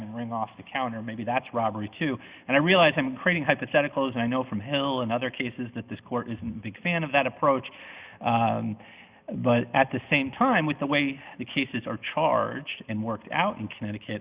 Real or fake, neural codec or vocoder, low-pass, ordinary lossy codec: real; none; 3.6 kHz; Opus, 64 kbps